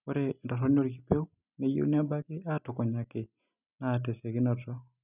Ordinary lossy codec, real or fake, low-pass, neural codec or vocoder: none; real; 3.6 kHz; none